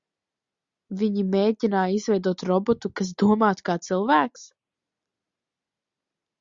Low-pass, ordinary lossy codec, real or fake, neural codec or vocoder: 7.2 kHz; Opus, 64 kbps; real; none